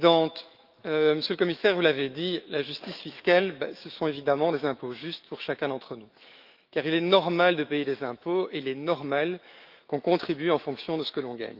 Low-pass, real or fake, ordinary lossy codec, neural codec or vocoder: 5.4 kHz; fake; Opus, 32 kbps; autoencoder, 48 kHz, 128 numbers a frame, DAC-VAE, trained on Japanese speech